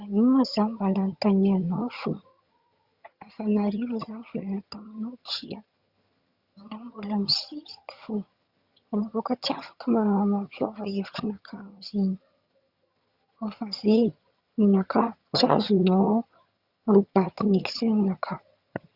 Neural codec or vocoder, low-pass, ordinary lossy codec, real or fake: vocoder, 22.05 kHz, 80 mel bands, HiFi-GAN; 5.4 kHz; Opus, 64 kbps; fake